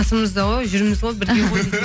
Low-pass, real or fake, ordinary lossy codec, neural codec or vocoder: none; real; none; none